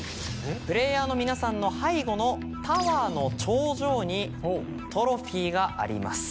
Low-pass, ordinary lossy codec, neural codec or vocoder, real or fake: none; none; none; real